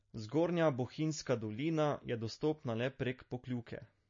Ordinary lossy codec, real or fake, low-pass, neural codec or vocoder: MP3, 32 kbps; real; 7.2 kHz; none